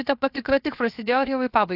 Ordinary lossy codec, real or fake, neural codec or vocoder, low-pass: AAC, 48 kbps; fake; codec, 16 kHz, 0.7 kbps, FocalCodec; 5.4 kHz